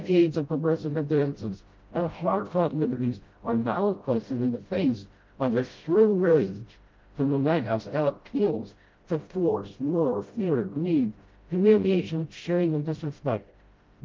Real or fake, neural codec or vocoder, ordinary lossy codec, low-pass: fake; codec, 16 kHz, 0.5 kbps, FreqCodec, smaller model; Opus, 32 kbps; 7.2 kHz